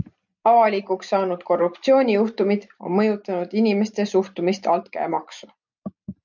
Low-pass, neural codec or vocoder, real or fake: 7.2 kHz; none; real